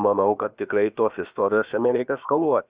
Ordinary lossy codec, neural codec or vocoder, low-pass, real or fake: Opus, 64 kbps; codec, 16 kHz, about 1 kbps, DyCAST, with the encoder's durations; 3.6 kHz; fake